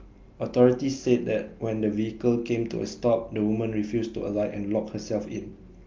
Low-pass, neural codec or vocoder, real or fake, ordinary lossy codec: 7.2 kHz; none; real; Opus, 24 kbps